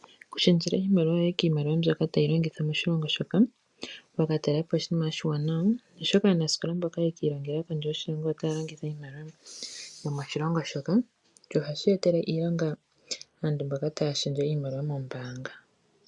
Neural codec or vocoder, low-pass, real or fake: none; 10.8 kHz; real